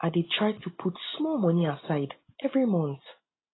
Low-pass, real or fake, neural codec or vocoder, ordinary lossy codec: 7.2 kHz; real; none; AAC, 16 kbps